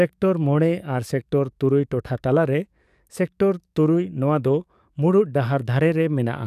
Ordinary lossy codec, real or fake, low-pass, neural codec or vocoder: none; fake; 14.4 kHz; codec, 44.1 kHz, 7.8 kbps, DAC